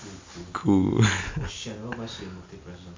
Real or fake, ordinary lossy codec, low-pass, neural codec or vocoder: real; MP3, 48 kbps; 7.2 kHz; none